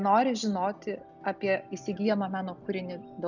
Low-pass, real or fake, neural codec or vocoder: 7.2 kHz; real; none